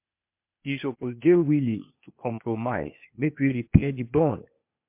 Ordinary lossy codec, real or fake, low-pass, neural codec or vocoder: MP3, 32 kbps; fake; 3.6 kHz; codec, 16 kHz, 0.8 kbps, ZipCodec